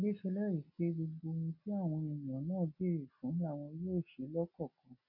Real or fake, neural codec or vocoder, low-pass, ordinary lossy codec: real; none; 5.4 kHz; none